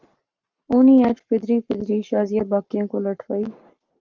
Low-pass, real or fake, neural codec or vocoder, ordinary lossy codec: 7.2 kHz; fake; codec, 44.1 kHz, 7.8 kbps, Pupu-Codec; Opus, 24 kbps